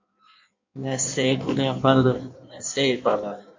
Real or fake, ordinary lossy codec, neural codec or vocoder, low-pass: fake; MP3, 48 kbps; codec, 16 kHz in and 24 kHz out, 1.1 kbps, FireRedTTS-2 codec; 7.2 kHz